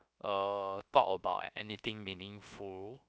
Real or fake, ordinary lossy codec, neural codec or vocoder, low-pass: fake; none; codec, 16 kHz, 0.7 kbps, FocalCodec; none